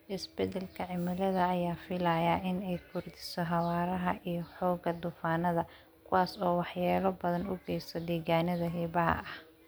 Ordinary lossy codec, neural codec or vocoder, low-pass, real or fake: none; none; none; real